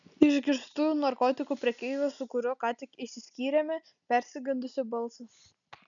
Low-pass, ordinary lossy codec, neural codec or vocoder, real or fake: 7.2 kHz; MP3, 96 kbps; none; real